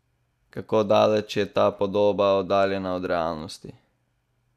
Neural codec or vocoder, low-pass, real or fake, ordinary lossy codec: none; 14.4 kHz; real; none